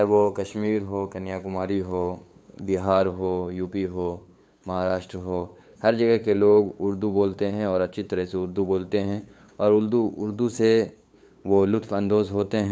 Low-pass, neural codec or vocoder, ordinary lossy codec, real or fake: none; codec, 16 kHz, 8 kbps, FunCodec, trained on LibriTTS, 25 frames a second; none; fake